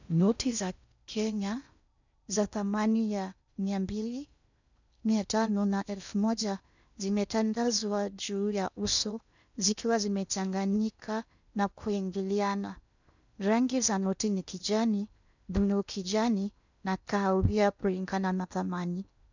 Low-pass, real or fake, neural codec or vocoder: 7.2 kHz; fake; codec, 16 kHz in and 24 kHz out, 0.6 kbps, FocalCodec, streaming, 2048 codes